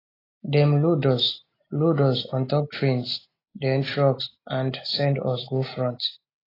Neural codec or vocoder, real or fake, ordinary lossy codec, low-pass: none; real; AAC, 24 kbps; 5.4 kHz